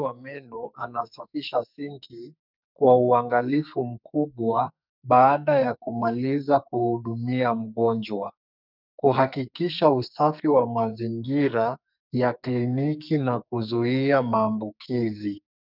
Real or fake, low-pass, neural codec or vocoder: fake; 5.4 kHz; codec, 44.1 kHz, 2.6 kbps, SNAC